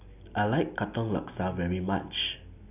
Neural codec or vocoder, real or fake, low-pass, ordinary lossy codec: none; real; 3.6 kHz; none